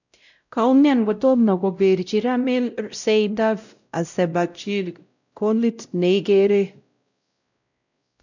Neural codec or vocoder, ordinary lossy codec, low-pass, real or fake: codec, 16 kHz, 0.5 kbps, X-Codec, WavLM features, trained on Multilingual LibriSpeech; none; 7.2 kHz; fake